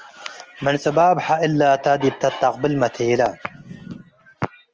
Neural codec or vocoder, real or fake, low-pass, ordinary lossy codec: none; real; 7.2 kHz; Opus, 24 kbps